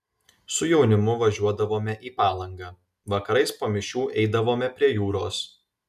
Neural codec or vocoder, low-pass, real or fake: none; 14.4 kHz; real